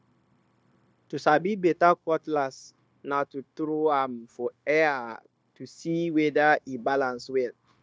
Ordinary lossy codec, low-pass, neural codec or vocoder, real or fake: none; none; codec, 16 kHz, 0.9 kbps, LongCat-Audio-Codec; fake